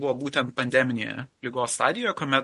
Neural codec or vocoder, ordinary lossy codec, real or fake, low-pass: none; MP3, 48 kbps; real; 14.4 kHz